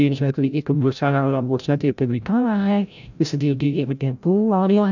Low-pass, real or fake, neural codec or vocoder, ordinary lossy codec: 7.2 kHz; fake; codec, 16 kHz, 0.5 kbps, FreqCodec, larger model; none